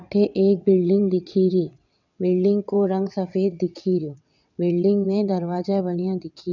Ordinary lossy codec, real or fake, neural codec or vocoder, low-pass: none; fake; vocoder, 22.05 kHz, 80 mel bands, WaveNeXt; 7.2 kHz